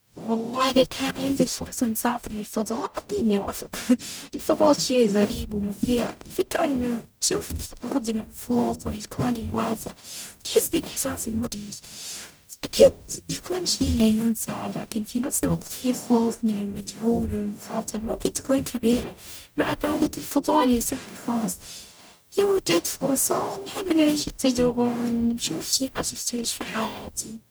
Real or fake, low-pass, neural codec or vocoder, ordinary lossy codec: fake; none; codec, 44.1 kHz, 0.9 kbps, DAC; none